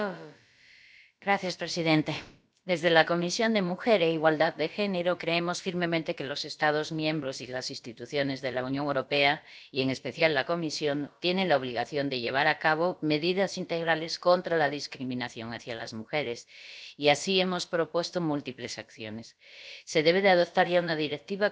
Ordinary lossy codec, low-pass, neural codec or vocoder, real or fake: none; none; codec, 16 kHz, about 1 kbps, DyCAST, with the encoder's durations; fake